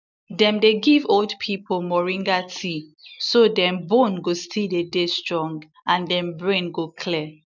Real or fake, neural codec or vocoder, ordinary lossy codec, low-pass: real; none; none; 7.2 kHz